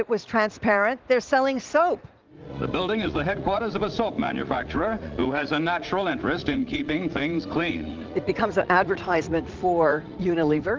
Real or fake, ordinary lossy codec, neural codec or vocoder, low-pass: fake; Opus, 32 kbps; vocoder, 44.1 kHz, 80 mel bands, Vocos; 7.2 kHz